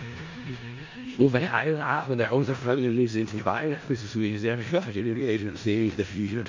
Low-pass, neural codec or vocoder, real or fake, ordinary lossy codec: 7.2 kHz; codec, 16 kHz in and 24 kHz out, 0.4 kbps, LongCat-Audio-Codec, four codebook decoder; fake; MP3, 48 kbps